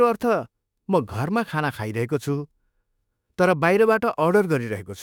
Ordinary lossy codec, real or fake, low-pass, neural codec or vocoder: none; fake; 19.8 kHz; autoencoder, 48 kHz, 32 numbers a frame, DAC-VAE, trained on Japanese speech